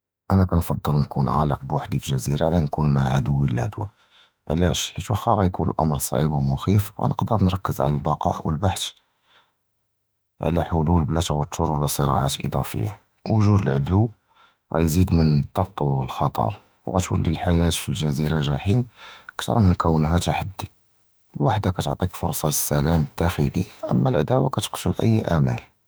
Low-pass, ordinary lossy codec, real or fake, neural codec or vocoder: none; none; fake; autoencoder, 48 kHz, 32 numbers a frame, DAC-VAE, trained on Japanese speech